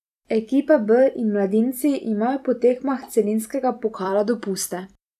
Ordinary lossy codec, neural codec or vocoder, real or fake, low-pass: none; vocoder, 44.1 kHz, 128 mel bands every 256 samples, BigVGAN v2; fake; 14.4 kHz